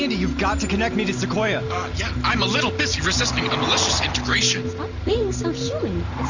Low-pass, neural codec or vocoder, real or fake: 7.2 kHz; none; real